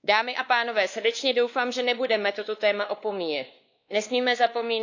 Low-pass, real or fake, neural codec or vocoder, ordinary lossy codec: 7.2 kHz; fake; codec, 16 kHz, 2 kbps, X-Codec, WavLM features, trained on Multilingual LibriSpeech; AAC, 48 kbps